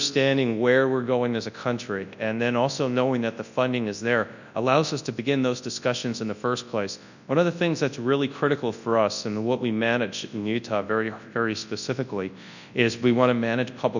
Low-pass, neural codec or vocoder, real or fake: 7.2 kHz; codec, 24 kHz, 0.9 kbps, WavTokenizer, large speech release; fake